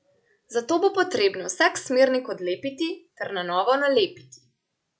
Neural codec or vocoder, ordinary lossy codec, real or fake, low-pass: none; none; real; none